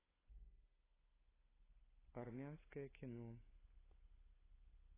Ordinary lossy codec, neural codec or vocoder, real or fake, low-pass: AAC, 16 kbps; codec, 16 kHz, 16 kbps, FunCodec, trained on LibriTTS, 50 frames a second; fake; 3.6 kHz